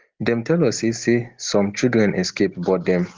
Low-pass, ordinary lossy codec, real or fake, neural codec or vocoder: 7.2 kHz; Opus, 16 kbps; real; none